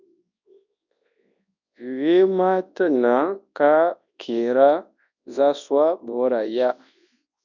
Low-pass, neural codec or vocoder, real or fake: 7.2 kHz; codec, 24 kHz, 0.9 kbps, WavTokenizer, large speech release; fake